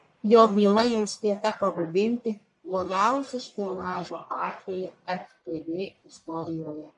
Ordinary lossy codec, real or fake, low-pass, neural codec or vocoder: MP3, 64 kbps; fake; 10.8 kHz; codec, 44.1 kHz, 1.7 kbps, Pupu-Codec